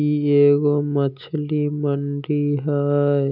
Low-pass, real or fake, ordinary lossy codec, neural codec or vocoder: 5.4 kHz; real; none; none